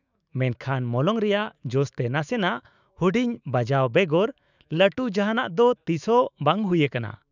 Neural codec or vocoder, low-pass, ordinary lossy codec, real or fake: autoencoder, 48 kHz, 128 numbers a frame, DAC-VAE, trained on Japanese speech; 7.2 kHz; none; fake